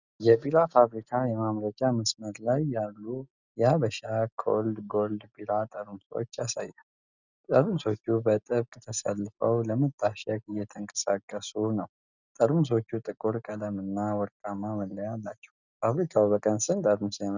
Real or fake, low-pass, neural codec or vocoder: real; 7.2 kHz; none